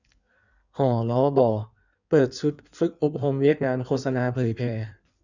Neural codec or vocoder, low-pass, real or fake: codec, 16 kHz in and 24 kHz out, 1.1 kbps, FireRedTTS-2 codec; 7.2 kHz; fake